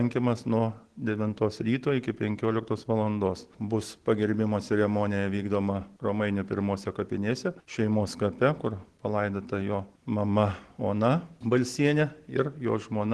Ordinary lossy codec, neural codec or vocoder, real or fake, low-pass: Opus, 16 kbps; none; real; 10.8 kHz